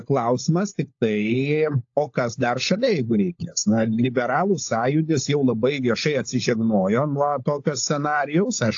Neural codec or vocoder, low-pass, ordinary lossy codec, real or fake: codec, 16 kHz, 16 kbps, FunCodec, trained on LibriTTS, 50 frames a second; 7.2 kHz; AAC, 48 kbps; fake